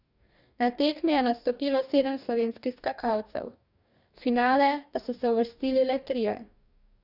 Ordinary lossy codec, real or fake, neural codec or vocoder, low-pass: none; fake; codec, 44.1 kHz, 2.6 kbps, DAC; 5.4 kHz